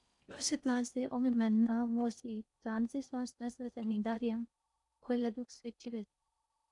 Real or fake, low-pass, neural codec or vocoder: fake; 10.8 kHz; codec, 16 kHz in and 24 kHz out, 0.6 kbps, FocalCodec, streaming, 4096 codes